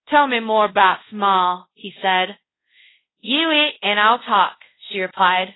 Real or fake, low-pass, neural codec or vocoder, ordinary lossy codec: fake; 7.2 kHz; codec, 24 kHz, 0.5 kbps, DualCodec; AAC, 16 kbps